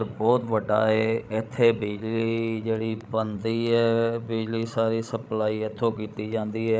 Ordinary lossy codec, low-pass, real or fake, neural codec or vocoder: none; none; fake; codec, 16 kHz, 16 kbps, FreqCodec, larger model